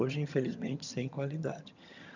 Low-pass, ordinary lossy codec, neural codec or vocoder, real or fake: 7.2 kHz; none; vocoder, 22.05 kHz, 80 mel bands, HiFi-GAN; fake